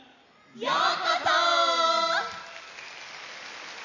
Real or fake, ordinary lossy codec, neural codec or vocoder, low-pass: real; none; none; 7.2 kHz